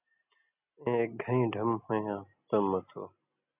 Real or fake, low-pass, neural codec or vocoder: real; 3.6 kHz; none